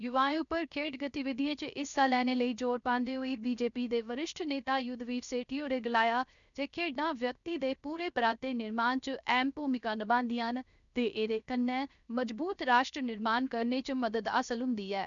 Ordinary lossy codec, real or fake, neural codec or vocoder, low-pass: none; fake; codec, 16 kHz, 0.7 kbps, FocalCodec; 7.2 kHz